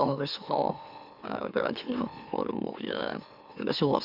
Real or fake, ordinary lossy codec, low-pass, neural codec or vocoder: fake; Opus, 64 kbps; 5.4 kHz; autoencoder, 44.1 kHz, a latent of 192 numbers a frame, MeloTTS